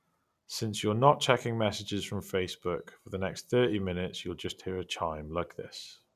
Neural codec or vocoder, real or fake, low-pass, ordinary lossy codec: none; real; 14.4 kHz; none